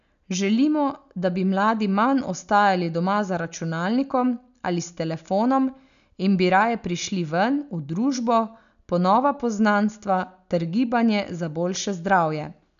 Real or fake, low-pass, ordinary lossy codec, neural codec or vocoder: real; 7.2 kHz; none; none